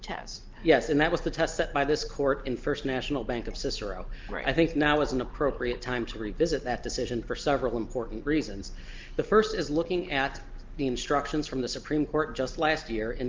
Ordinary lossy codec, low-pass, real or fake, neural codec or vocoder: Opus, 32 kbps; 7.2 kHz; real; none